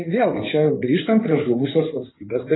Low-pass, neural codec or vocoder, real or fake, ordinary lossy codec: 7.2 kHz; codec, 16 kHz in and 24 kHz out, 2.2 kbps, FireRedTTS-2 codec; fake; AAC, 16 kbps